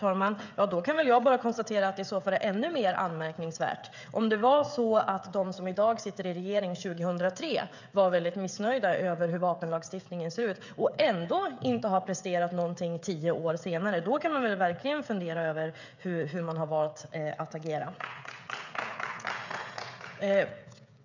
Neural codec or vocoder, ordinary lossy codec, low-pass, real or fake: codec, 16 kHz, 16 kbps, FreqCodec, smaller model; none; 7.2 kHz; fake